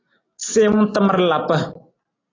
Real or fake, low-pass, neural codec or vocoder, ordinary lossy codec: real; 7.2 kHz; none; AAC, 32 kbps